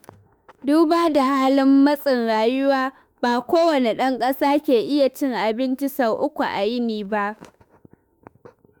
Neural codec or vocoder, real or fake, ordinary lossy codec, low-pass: autoencoder, 48 kHz, 32 numbers a frame, DAC-VAE, trained on Japanese speech; fake; none; none